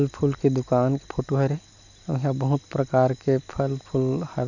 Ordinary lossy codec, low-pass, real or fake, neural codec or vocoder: none; 7.2 kHz; real; none